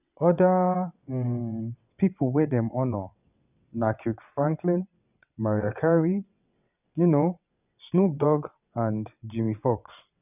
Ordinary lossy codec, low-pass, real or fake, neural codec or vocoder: none; 3.6 kHz; fake; vocoder, 22.05 kHz, 80 mel bands, WaveNeXt